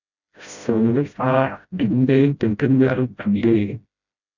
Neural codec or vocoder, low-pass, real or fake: codec, 16 kHz, 0.5 kbps, FreqCodec, smaller model; 7.2 kHz; fake